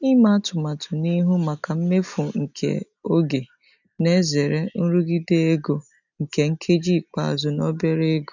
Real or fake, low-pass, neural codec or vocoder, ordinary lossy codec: real; 7.2 kHz; none; none